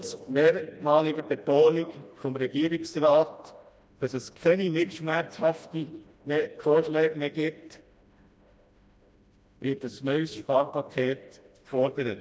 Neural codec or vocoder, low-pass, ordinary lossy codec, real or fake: codec, 16 kHz, 1 kbps, FreqCodec, smaller model; none; none; fake